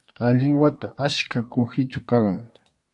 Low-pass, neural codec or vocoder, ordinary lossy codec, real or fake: 10.8 kHz; codec, 24 kHz, 1 kbps, SNAC; AAC, 64 kbps; fake